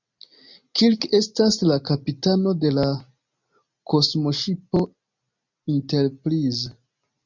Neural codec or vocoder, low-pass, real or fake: none; 7.2 kHz; real